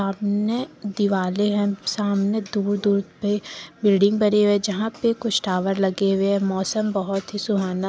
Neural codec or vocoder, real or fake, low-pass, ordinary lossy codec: none; real; none; none